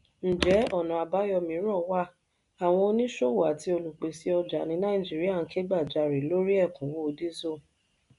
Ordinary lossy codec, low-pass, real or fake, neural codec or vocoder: none; 10.8 kHz; real; none